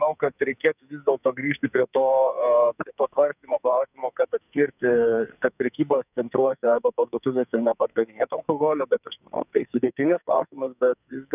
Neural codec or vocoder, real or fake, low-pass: codec, 44.1 kHz, 2.6 kbps, SNAC; fake; 3.6 kHz